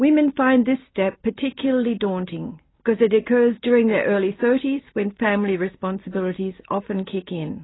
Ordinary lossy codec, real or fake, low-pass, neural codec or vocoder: AAC, 16 kbps; real; 7.2 kHz; none